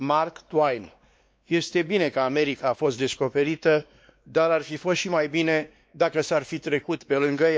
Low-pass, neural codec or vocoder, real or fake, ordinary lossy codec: none; codec, 16 kHz, 2 kbps, X-Codec, WavLM features, trained on Multilingual LibriSpeech; fake; none